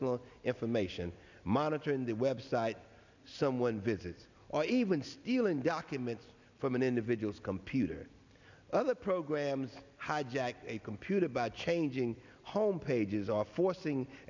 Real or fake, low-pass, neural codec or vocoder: real; 7.2 kHz; none